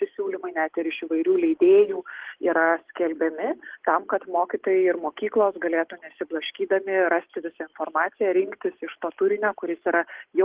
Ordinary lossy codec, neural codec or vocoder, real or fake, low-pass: Opus, 16 kbps; none; real; 3.6 kHz